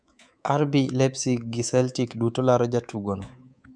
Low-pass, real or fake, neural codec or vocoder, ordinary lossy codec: 9.9 kHz; fake; codec, 24 kHz, 3.1 kbps, DualCodec; none